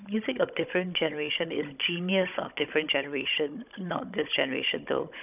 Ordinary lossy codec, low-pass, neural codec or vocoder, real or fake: none; 3.6 kHz; codec, 16 kHz, 16 kbps, FunCodec, trained on Chinese and English, 50 frames a second; fake